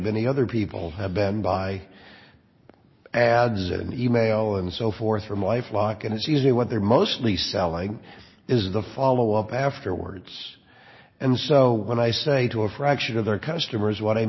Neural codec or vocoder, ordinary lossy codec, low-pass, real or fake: none; MP3, 24 kbps; 7.2 kHz; real